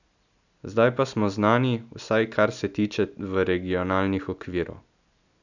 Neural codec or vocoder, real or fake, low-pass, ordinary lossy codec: none; real; 7.2 kHz; none